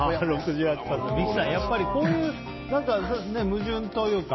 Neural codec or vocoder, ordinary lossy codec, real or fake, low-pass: none; MP3, 24 kbps; real; 7.2 kHz